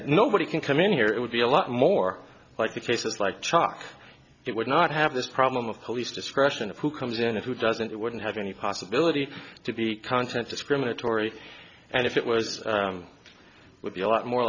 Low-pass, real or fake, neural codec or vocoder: 7.2 kHz; real; none